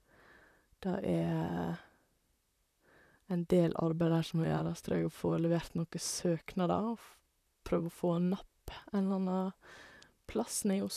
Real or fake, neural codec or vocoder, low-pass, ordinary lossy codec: fake; vocoder, 44.1 kHz, 128 mel bands, Pupu-Vocoder; 14.4 kHz; none